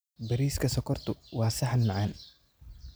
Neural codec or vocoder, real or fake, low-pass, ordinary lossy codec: none; real; none; none